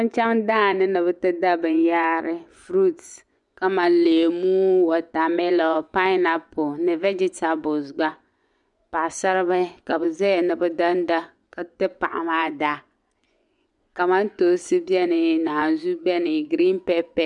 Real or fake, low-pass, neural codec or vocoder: fake; 10.8 kHz; vocoder, 24 kHz, 100 mel bands, Vocos